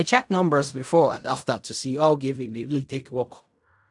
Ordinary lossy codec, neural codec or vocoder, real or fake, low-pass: none; codec, 16 kHz in and 24 kHz out, 0.4 kbps, LongCat-Audio-Codec, fine tuned four codebook decoder; fake; 10.8 kHz